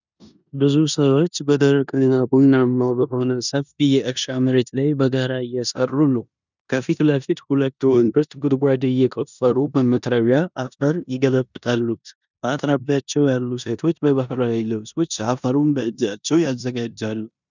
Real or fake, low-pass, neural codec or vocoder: fake; 7.2 kHz; codec, 16 kHz in and 24 kHz out, 0.9 kbps, LongCat-Audio-Codec, four codebook decoder